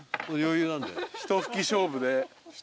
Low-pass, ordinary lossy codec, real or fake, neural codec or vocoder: none; none; real; none